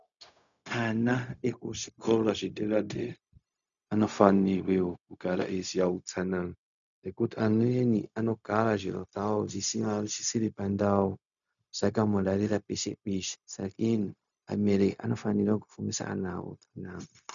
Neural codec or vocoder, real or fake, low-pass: codec, 16 kHz, 0.4 kbps, LongCat-Audio-Codec; fake; 7.2 kHz